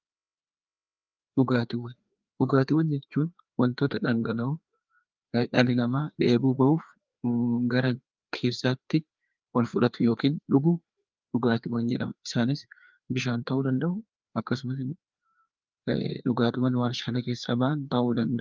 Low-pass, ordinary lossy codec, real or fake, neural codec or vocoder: 7.2 kHz; Opus, 32 kbps; fake; codec, 16 kHz, 2 kbps, FreqCodec, larger model